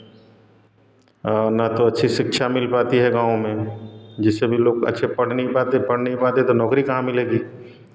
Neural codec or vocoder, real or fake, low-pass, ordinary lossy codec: none; real; none; none